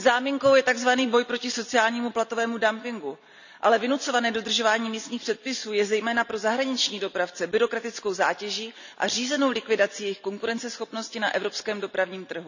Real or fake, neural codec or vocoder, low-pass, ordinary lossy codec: real; none; 7.2 kHz; none